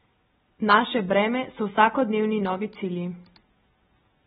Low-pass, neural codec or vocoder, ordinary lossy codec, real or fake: 9.9 kHz; none; AAC, 16 kbps; real